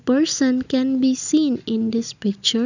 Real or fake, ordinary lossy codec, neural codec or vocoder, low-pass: real; none; none; 7.2 kHz